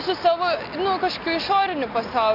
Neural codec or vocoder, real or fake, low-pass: none; real; 5.4 kHz